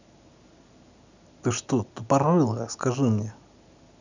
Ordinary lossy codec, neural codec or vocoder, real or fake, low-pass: none; none; real; 7.2 kHz